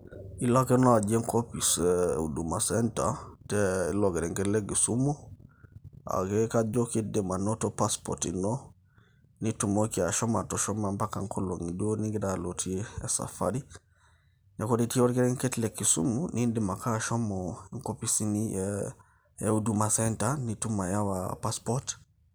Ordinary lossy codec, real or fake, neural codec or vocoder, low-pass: none; real; none; none